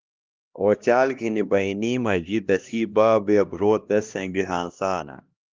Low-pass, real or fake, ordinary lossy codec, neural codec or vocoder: 7.2 kHz; fake; Opus, 32 kbps; codec, 16 kHz, 1 kbps, X-Codec, HuBERT features, trained on LibriSpeech